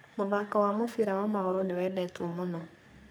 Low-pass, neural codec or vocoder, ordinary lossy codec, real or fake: none; codec, 44.1 kHz, 3.4 kbps, Pupu-Codec; none; fake